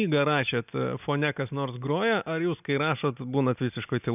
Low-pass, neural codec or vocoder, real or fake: 3.6 kHz; none; real